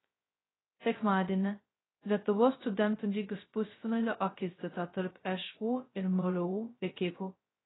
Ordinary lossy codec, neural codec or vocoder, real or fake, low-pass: AAC, 16 kbps; codec, 16 kHz, 0.2 kbps, FocalCodec; fake; 7.2 kHz